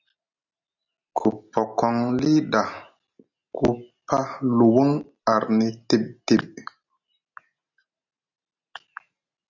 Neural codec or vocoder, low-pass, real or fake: none; 7.2 kHz; real